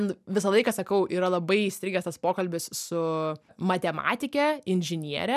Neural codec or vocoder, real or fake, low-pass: none; real; 14.4 kHz